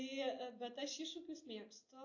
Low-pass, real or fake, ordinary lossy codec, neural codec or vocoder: 7.2 kHz; real; AAC, 48 kbps; none